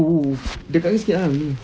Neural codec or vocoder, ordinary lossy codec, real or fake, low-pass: none; none; real; none